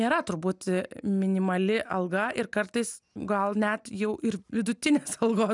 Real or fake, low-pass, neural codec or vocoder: real; 10.8 kHz; none